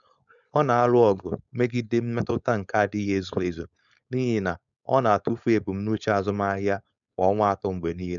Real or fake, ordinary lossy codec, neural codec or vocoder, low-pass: fake; none; codec, 16 kHz, 4.8 kbps, FACodec; 7.2 kHz